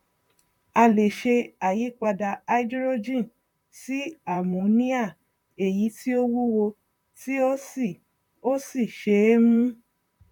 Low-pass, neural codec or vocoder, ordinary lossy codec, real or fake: 19.8 kHz; vocoder, 44.1 kHz, 128 mel bands, Pupu-Vocoder; none; fake